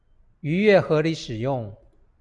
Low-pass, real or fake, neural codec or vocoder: 10.8 kHz; real; none